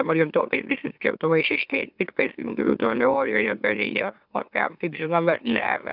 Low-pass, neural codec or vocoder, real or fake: 5.4 kHz; autoencoder, 44.1 kHz, a latent of 192 numbers a frame, MeloTTS; fake